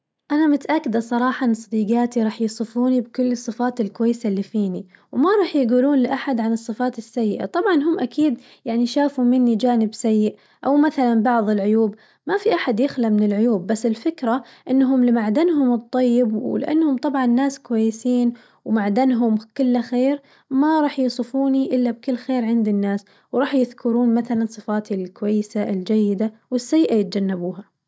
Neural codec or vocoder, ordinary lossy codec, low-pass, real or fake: none; none; none; real